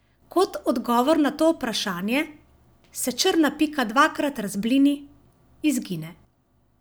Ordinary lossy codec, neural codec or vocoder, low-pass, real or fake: none; none; none; real